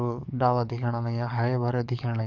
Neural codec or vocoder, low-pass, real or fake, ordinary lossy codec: codec, 44.1 kHz, 7.8 kbps, DAC; 7.2 kHz; fake; none